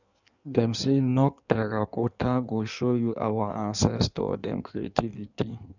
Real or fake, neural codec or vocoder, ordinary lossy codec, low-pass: fake; codec, 16 kHz in and 24 kHz out, 1.1 kbps, FireRedTTS-2 codec; none; 7.2 kHz